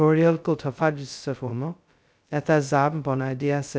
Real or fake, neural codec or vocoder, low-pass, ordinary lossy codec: fake; codec, 16 kHz, 0.2 kbps, FocalCodec; none; none